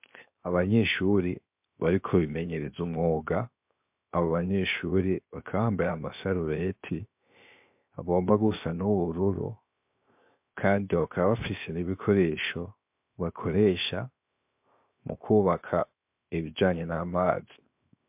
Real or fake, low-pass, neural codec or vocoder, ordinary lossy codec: fake; 3.6 kHz; codec, 16 kHz, 0.7 kbps, FocalCodec; MP3, 32 kbps